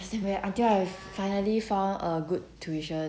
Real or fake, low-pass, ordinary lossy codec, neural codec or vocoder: real; none; none; none